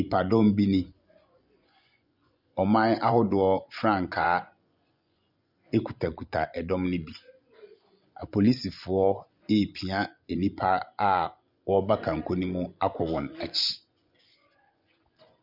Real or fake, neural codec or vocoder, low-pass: fake; vocoder, 24 kHz, 100 mel bands, Vocos; 5.4 kHz